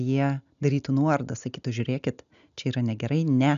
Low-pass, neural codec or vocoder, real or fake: 7.2 kHz; none; real